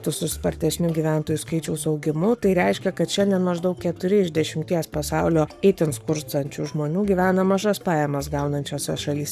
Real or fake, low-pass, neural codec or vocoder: fake; 14.4 kHz; codec, 44.1 kHz, 7.8 kbps, Pupu-Codec